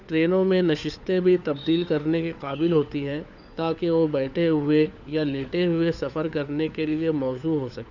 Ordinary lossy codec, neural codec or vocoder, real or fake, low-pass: none; codec, 16 kHz, 4 kbps, FunCodec, trained on LibriTTS, 50 frames a second; fake; 7.2 kHz